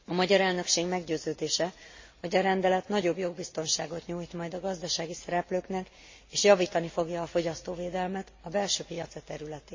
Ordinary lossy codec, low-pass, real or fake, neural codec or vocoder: MP3, 32 kbps; 7.2 kHz; real; none